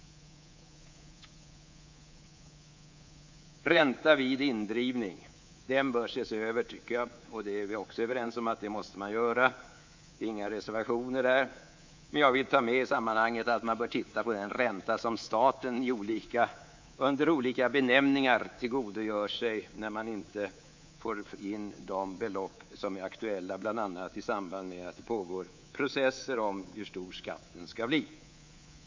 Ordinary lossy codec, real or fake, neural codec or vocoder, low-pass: MP3, 64 kbps; fake; codec, 24 kHz, 3.1 kbps, DualCodec; 7.2 kHz